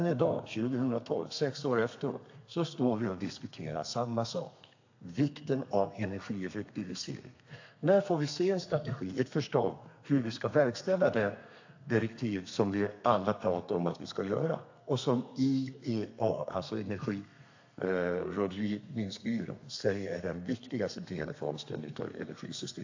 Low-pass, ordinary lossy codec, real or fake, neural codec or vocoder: 7.2 kHz; AAC, 48 kbps; fake; codec, 32 kHz, 1.9 kbps, SNAC